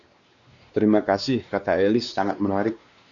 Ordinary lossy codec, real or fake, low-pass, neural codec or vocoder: Opus, 64 kbps; fake; 7.2 kHz; codec, 16 kHz, 2 kbps, X-Codec, WavLM features, trained on Multilingual LibriSpeech